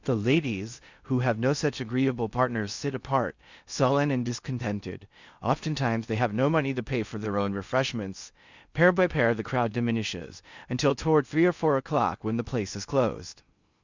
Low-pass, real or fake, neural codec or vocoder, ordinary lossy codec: 7.2 kHz; fake; codec, 16 kHz in and 24 kHz out, 0.6 kbps, FocalCodec, streaming, 2048 codes; Opus, 64 kbps